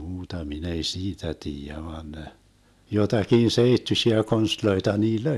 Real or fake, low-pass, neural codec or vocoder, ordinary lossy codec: real; none; none; none